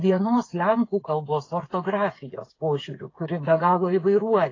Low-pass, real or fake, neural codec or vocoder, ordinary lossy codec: 7.2 kHz; fake; codec, 16 kHz, 8 kbps, FreqCodec, smaller model; AAC, 32 kbps